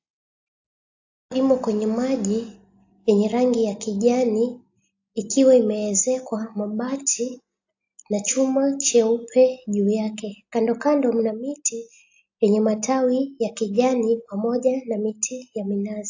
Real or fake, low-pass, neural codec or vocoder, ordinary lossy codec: real; 7.2 kHz; none; AAC, 48 kbps